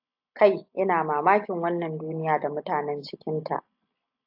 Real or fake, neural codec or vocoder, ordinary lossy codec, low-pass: real; none; AAC, 48 kbps; 5.4 kHz